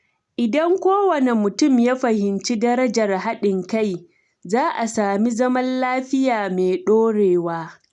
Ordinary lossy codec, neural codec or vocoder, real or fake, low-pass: none; none; real; 10.8 kHz